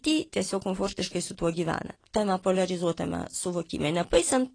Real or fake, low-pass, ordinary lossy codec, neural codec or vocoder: real; 9.9 kHz; AAC, 32 kbps; none